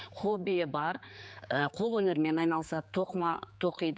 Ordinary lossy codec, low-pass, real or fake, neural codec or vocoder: none; none; fake; codec, 16 kHz, 4 kbps, X-Codec, HuBERT features, trained on general audio